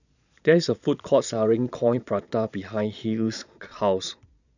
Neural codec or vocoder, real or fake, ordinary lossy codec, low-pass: vocoder, 22.05 kHz, 80 mel bands, Vocos; fake; none; 7.2 kHz